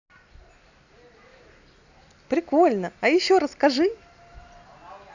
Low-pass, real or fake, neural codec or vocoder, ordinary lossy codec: 7.2 kHz; real; none; none